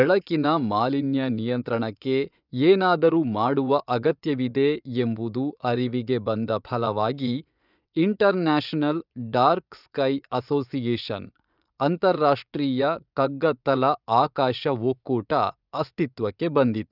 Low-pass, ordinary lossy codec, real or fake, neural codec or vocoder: 5.4 kHz; none; fake; vocoder, 24 kHz, 100 mel bands, Vocos